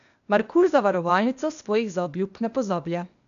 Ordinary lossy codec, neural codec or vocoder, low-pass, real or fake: none; codec, 16 kHz, 0.8 kbps, ZipCodec; 7.2 kHz; fake